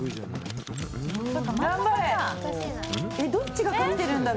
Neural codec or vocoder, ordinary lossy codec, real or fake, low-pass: none; none; real; none